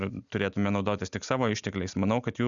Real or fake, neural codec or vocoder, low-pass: real; none; 7.2 kHz